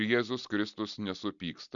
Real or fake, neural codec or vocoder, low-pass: real; none; 7.2 kHz